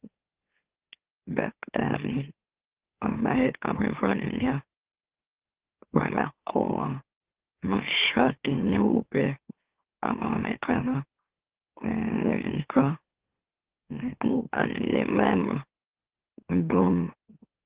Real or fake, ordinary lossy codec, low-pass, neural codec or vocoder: fake; Opus, 16 kbps; 3.6 kHz; autoencoder, 44.1 kHz, a latent of 192 numbers a frame, MeloTTS